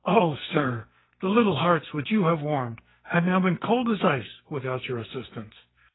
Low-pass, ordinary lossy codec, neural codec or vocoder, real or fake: 7.2 kHz; AAC, 16 kbps; codec, 44.1 kHz, 2.6 kbps, SNAC; fake